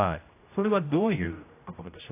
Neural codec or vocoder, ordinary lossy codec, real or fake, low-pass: codec, 16 kHz, 0.5 kbps, X-Codec, HuBERT features, trained on general audio; AAC, 24 kbps; fake; 3.6 kHz